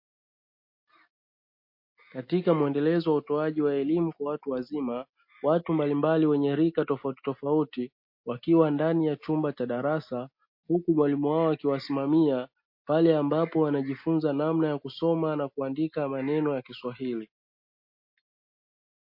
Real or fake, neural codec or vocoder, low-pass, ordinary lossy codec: real; none; 5.4 kHz; MP3, 32 kbps